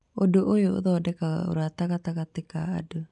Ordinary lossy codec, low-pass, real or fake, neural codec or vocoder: none; 10.8 kHz; real; none